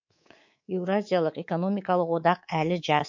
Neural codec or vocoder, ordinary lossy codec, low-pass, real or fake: codec, 24 kHz, 3.1 kbps, DualCodec; MP3, 48 kbps; 7.2 kHz; fake